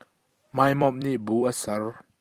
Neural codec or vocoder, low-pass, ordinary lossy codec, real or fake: vocoder, 44.1 kHz, 128 mel bands every 256 samples, BigVGAN v2; 14.4 kHz; Opus, 32 kbps; fake